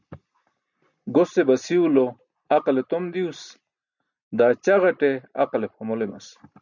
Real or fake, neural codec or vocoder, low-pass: real; none; 7.2 kHz